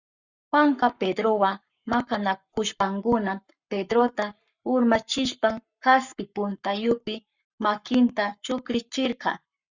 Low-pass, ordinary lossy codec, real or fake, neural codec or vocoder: 7.2 kHz; Opus, 64 kbps; fake; codec, 44.1 kHz, 7.8 kbps, Pupu-Codec